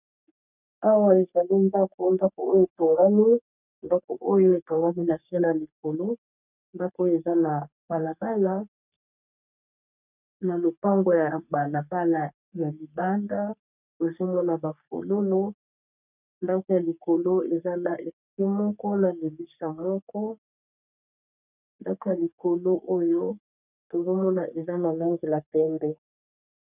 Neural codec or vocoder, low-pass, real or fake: codec, 44.1 kHz, 3.4 kbps, Pupu-Codec; 3.6 kHz; fake